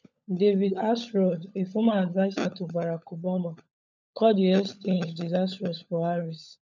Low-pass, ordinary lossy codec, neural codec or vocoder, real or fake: 7.2 kHz; none; codec, 16 kHz, 16 kbps, FunCodec, trained on LibriTTS, 50 frames a second; fake